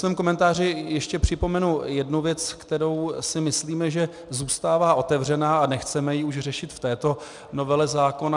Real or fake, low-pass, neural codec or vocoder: real; 10.8 kHz; none